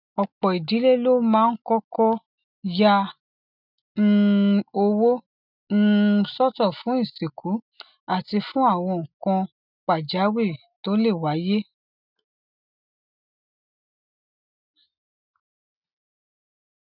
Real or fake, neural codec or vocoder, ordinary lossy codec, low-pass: real; none; none; 5.4 kHz